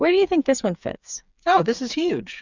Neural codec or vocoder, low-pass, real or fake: codec, 16 kHz, 4 kbps, FreqCodec, smaller model; 7.2 kHz; fake